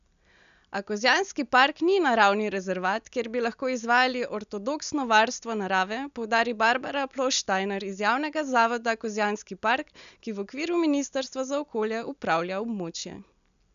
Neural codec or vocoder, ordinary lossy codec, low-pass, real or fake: none; none; 7.2 kHz; real